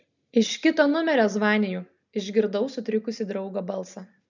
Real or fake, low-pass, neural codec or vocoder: real; 7.2 kHz; none